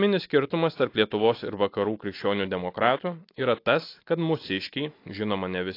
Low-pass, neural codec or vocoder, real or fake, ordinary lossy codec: 5.4 kHz; none; real; AAC, 32 kbps